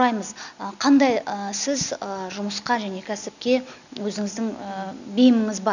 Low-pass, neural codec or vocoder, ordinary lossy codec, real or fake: 7.2 kHz; none; none; real